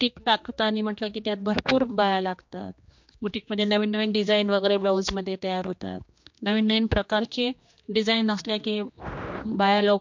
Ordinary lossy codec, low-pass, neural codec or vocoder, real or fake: MP3, 48 kbps; 7.2 kHz; codec, 16 kHz, 2 kbps, X-Codec, HuBERT features, trained on general audio; fake